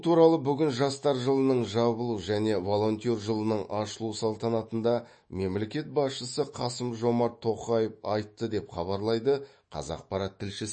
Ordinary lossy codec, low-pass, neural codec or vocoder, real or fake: MP3, 32 kbps; 9.9 kHz; autoencoder, 48 kHz, 128 numbers a frame, DAC-VAE, trained on Japanese speech; fake